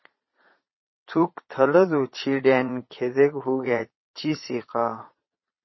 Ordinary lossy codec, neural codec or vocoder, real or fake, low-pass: MP3, 24 kbps; vocoder, 22.05 kHz, 80 mel bands, Vocos; fake; 7.2 kHz